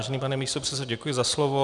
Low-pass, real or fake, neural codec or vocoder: 10.8 kHz; real; none